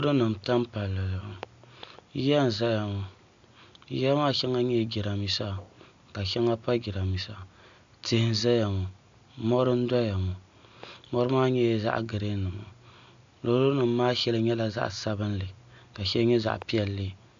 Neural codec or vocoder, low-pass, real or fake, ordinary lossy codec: none; 7.2 kHz; real; AAC, 64 kbps